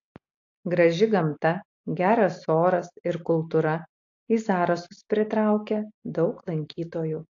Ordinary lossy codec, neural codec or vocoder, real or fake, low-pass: AAC, 48 kbps; none; real; 7.2 kHz